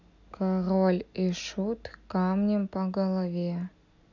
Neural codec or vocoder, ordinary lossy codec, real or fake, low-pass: none; none; real; 7.2 kHz